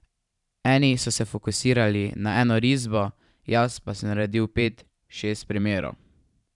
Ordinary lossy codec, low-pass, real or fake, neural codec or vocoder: none; 10.8 kHz; fake; vocoder, 44.1 kHz, 128 mel bands every 512 samples, BigVGAN v2